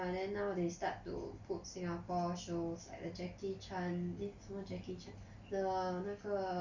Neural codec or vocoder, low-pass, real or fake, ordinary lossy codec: none; 7.2 kHz; real; Opus, 64 kbps